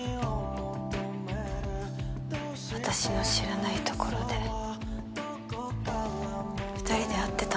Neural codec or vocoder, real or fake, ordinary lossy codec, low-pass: none; real; none; none